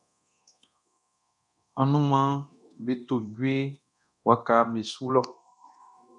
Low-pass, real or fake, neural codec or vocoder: 10.8 kHz; fake; codec, 24 kHz, 0.9 kbps, DualCodec